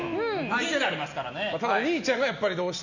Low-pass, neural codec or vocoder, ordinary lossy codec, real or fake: 7.2 kHz; none; none; real